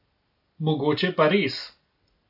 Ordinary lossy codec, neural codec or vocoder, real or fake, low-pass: AAC, 48 kbps; none; real; 5.4 kHz